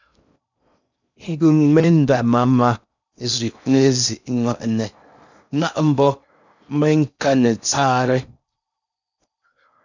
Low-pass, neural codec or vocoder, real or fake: 7.2 kHz; codec, 16 kHz in and 24 kHz out, 0.6 kbps, FocalCodec, streaming, 4096 codes; fake